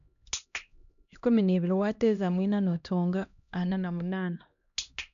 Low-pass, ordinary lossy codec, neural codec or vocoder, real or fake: 7.2 kHz; none; codec, 16 kHz, 1 kbps, X-Codec, HuBERT features, trained on LibriSpeech; fake